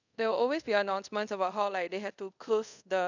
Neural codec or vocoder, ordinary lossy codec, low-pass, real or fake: codec, 24 kHz, 0.5 kbps, DualCodec; none; 7.2 kHz; fake